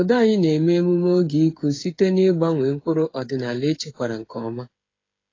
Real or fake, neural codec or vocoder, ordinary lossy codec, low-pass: fake; codec, 16 kHz, 8 kbps, FreqCodec, smaller model; AAC, 32 kbps; 7.2 kHz